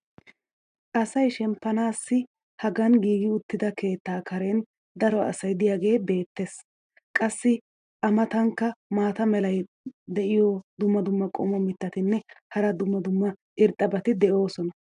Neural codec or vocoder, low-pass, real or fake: none; 9.9 kHz; real